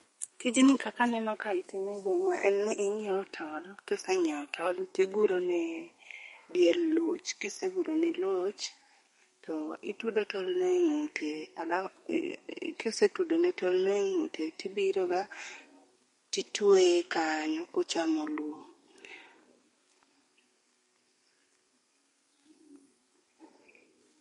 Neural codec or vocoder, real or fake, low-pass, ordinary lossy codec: codec, 32 kHz, 1.9 kbps, SNAC; fake; 14.4 kHz; MP3, 48 kbps